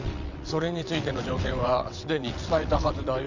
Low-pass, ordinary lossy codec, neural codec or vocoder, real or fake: 7.2 kHz; none; vocoder, 22.05 kHz, 80 mel bands, WaveNeXt; fake